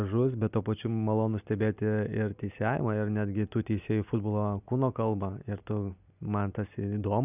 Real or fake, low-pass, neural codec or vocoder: real; 3.6 kHz; none